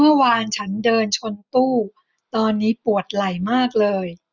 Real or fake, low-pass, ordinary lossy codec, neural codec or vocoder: real; 7.2 kHz; none; none